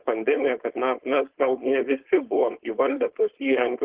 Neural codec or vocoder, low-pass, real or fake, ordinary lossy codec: codec, 16 kHz, 4.8 kbps, FACodec; 3.6 kHz; fake; Opus, 16 kbps